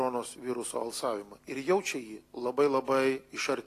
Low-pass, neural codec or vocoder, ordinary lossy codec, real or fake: 14.4 kHz; none; AAC, 48 kbps; real